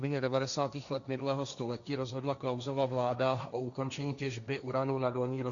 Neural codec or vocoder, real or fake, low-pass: codec, 16 kHz, 1.1 kbps, Voila-Tokenizer; fake; 7.2 kHz